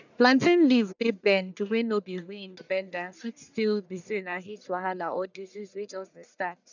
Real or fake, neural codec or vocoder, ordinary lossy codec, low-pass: fake; codec, 44.1 kHz, 1.7 kbps, Pupu-Codec; none; 7.2 kHz